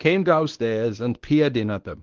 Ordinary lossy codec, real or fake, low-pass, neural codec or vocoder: Opus, 16 kbps; fake; 7.2 kHz; codec, 24 kHz, 0.9 kbps, WavTokenizer, medium speech release version 1